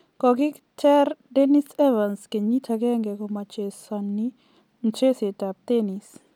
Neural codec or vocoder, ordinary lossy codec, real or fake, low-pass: none; none; real; 19.8 kHz